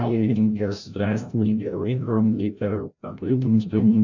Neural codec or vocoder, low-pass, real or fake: codec, 16 kHz, 0.5 kbps, FreqCodec, larger model; 7.2 kHz; fake